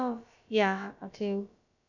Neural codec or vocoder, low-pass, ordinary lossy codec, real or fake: codec, 16 kHz, about 1 kbps, DyCAST, with the encoder's durations; 7.2 kHz; none; fake